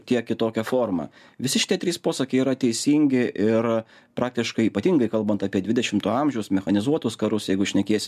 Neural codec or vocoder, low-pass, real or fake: none; 14.4 kHz; real